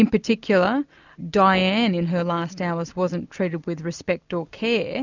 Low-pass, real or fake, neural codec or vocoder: 7.2 kHz; real; none